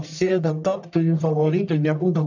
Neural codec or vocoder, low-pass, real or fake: codec, 44.1 kHz, 1.7 kbps, Pupu-Codec; 7.2 kHz; fake